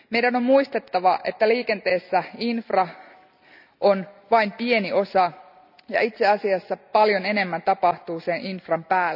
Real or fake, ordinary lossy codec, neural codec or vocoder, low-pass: real; none; none; 5.4 kHz